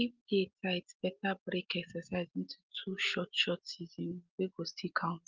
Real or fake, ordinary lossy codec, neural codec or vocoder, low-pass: real; Opus, 32 kbps; none; 7.2 kHz